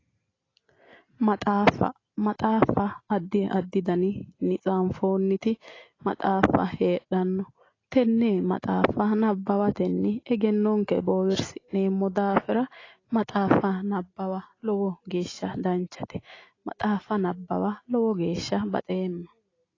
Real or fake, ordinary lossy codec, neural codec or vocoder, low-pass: real; AAC, 32 kbps; none; 7.2 kHz